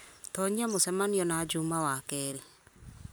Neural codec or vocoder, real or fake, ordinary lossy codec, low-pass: none; real; none; none